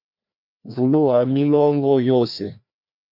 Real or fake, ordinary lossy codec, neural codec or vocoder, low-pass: fake; MP3, 48 kbps; codec, 16 kHz, 1 kbps, FreqCodec, larger model; 5.4 kHz